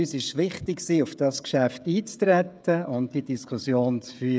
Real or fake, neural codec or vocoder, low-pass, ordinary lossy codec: fake; codec, 16 kHz, 16 kbps, FreqCodec, smaller model; none; none